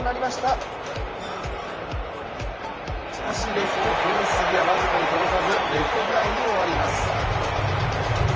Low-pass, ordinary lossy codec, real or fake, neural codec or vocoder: 7.2 kHz; Opus, 24 kbps; fake; vocoder, 44.1 kHz, 128 mel bands, Pupu-Vocoder